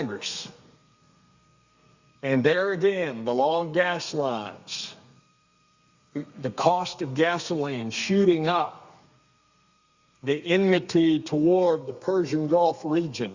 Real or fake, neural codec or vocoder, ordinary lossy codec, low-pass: fake; codec, 32 kHz, 1.9 kbps, SNAC; Opus, 64 kbps; 7.2 kHz